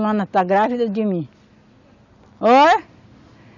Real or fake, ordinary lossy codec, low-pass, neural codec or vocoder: real; none; 7.2 kHz; none